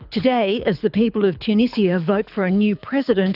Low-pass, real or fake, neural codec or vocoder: 5.4 kHz; fake; codec, 24 kHz, 6 kbps, HILCodec